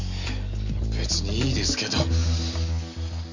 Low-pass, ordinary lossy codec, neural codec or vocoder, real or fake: 7.2 kHz; none; none; real